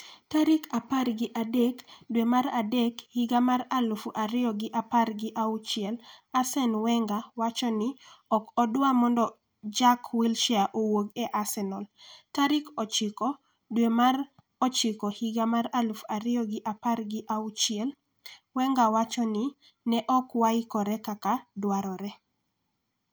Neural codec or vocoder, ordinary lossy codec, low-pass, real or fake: none; none; none; real